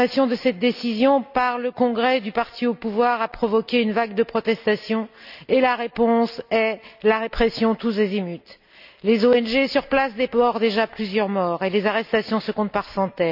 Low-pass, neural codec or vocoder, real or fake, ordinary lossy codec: 5.4 kHz; none; real; none